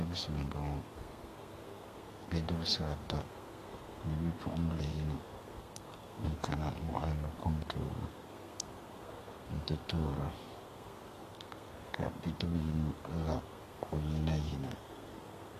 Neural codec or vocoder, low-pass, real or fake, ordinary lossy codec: codec, 32 kHz, 1.9 kbps, SNAC; 14.4 kHz; fake; AAC, 48 kbps